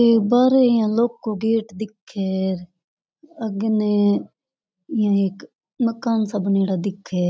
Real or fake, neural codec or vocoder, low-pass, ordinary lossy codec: real; none; none; none